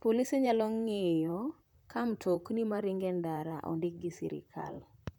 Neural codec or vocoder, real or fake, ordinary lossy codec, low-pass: vocoder, 44.1 kHz, 128 mel bands, Pupu-Vocoder; fake; none; none